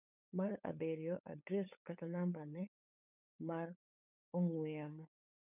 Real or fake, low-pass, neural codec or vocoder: fake; 3.6 kHz; codec, 16 kHz, 8 kbps, FunCodec, trained on LibriTTS, 25 frames a second